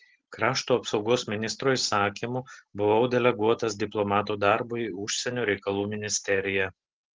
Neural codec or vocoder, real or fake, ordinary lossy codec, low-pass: none; real; Opus, 16 kbps; 7.2 kHz